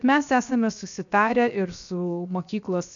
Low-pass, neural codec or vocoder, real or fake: 7.2 kHz; codec, 16 kHz, 0.7 kbps, FocalCodec; fake